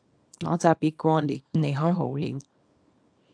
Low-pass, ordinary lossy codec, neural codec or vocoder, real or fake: 9.9 kHz; AAC, 64 kbps; codec, 24 kHz, 0.9 kbps, WavTokenizer, small release; fake